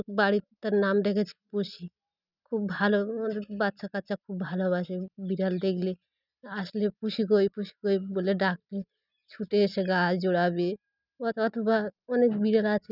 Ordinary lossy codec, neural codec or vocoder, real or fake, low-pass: none; none; real; 5.4 kHz